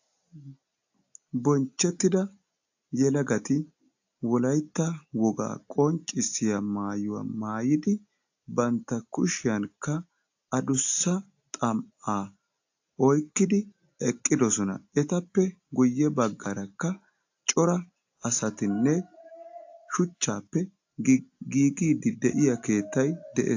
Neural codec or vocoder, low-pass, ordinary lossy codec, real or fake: none; 7.2 kHz; AAC, 48 kbps; real